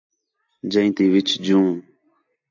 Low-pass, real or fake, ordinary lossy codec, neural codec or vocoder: 7.2 kHz; real; AAC, 48 kbps; none